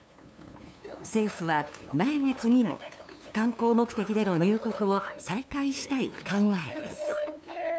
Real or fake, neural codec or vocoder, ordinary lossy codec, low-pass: fake; codec, 16 kHz, 2 kbps, FunCodec, trained on LibriTTS, 25 frames a second; none; none